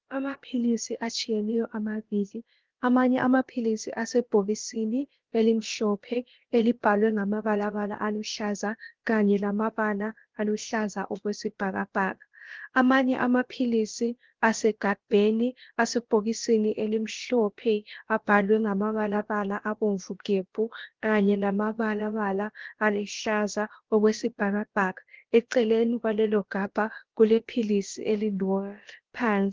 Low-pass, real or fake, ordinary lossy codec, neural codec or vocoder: 7.2 kHz; fake; Opus, 16 kbps; codec, 16 kHz, about 1 kbps, DyCAST, with the encoder's durations